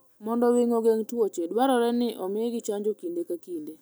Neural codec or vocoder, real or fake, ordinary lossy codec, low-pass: none; real; none; none